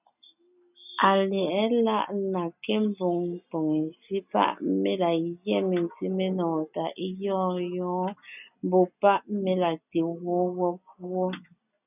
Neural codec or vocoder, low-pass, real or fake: none; 3.6 kHz; real